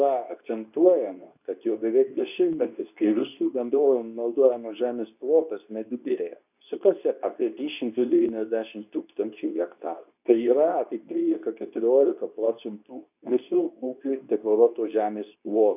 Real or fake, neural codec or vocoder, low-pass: fake; codec, 24 kHz, 0.9 kbps, WavTokenizer, medium speech release version 2; 3.6 kHz